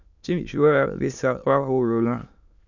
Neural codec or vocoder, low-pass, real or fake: autoencoder, 22.05 kHz, a latent of 192 numbers a frame, VITS, trained on many speakers; 7.2 kHz; fake